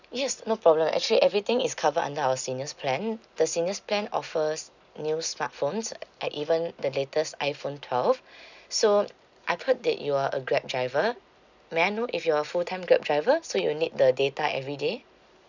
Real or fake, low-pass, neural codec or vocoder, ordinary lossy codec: real; 7.2 kHz; none; none